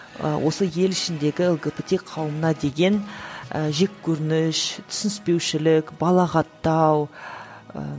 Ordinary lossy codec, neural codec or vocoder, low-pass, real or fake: none; none; none; real